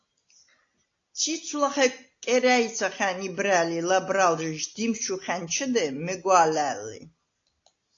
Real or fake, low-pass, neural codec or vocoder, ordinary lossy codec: real; 7.2 kHz; none; AAC, 48 kbps